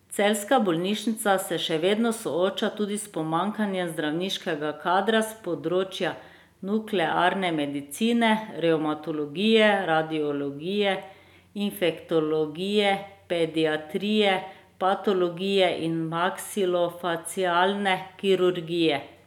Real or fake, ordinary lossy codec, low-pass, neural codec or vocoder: real; none; 19.8 kHz; none